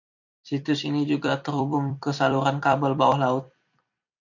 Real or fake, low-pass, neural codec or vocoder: fake; 7.2 kHz; vocoder, 44.1 kHz, 128 mel bands every 256 samples, BigVGAN v2